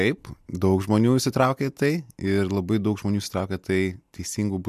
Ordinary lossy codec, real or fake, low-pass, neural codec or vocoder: MP3, 96 kbps; real; 14.4 kHz; none